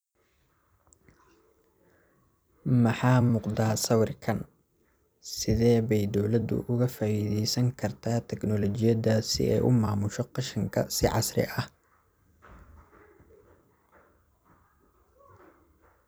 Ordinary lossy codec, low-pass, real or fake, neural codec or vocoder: none; none; fake; vocoder, 44.1 kHz, 128 mel bands every 256 samples, BigVGAN v2